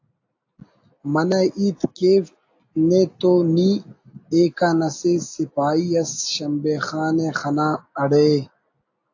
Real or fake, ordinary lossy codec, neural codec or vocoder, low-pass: real; AAC, 48 kbps; none; 7.2 kHz